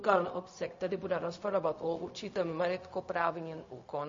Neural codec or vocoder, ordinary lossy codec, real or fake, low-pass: codec, 16 kHz, 0.4 kbps, LongCat-Audio-Codec; MP3, 32 kbps; fake; 7.2 kHz